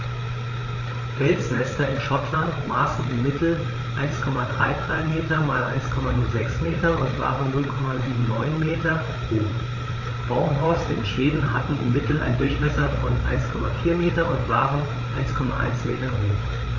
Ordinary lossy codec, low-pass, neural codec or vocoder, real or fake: none; 7.2 kHz; codec, 16 kHz, 8 kbps, FreqCodec, larger model; fake